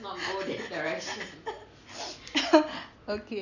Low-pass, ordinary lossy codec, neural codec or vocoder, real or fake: 7.2 kHz; none; none; real